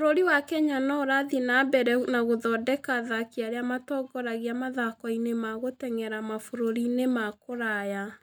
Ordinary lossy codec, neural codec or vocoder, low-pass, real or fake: none; none; none; real